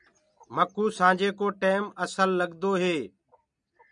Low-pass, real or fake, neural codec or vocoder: 9.9 kHz; real; none